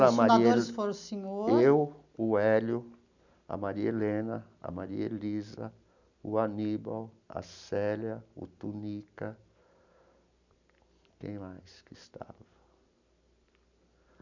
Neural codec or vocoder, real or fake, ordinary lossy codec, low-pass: none; real; none; 7.2 kHz